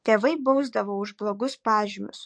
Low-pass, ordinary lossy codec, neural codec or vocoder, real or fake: 9.9 kHz; MP3, 48 kbps; codec, 44.1 kHz, 7.8 kbps, DAC; fake